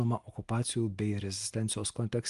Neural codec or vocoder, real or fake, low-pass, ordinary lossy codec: none; real; 10.8 kHz; Opus, 32 kbps